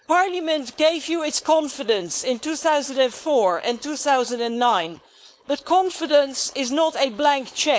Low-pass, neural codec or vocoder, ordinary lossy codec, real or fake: none; codec, 16 kHz, 4.8 kbps, FACodec; none; fake